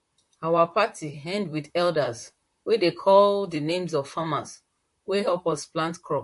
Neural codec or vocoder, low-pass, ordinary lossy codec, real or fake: vocoder, 44.1 kHz, 128 mel bands, Pupu-Vocoder; 14.4 kHz; MP3, 48 kbps; fake